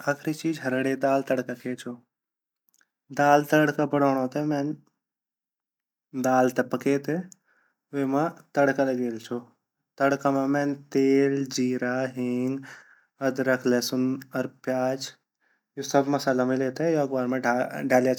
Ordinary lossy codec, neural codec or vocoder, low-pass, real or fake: none; none; 19.8 kHz; real